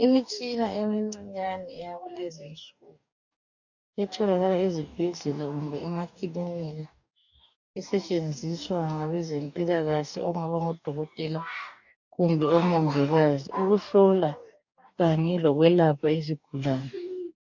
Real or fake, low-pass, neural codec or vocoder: fake; 7.2 kHz; codec, 44.1 kHz, 2.6 kbps, DAC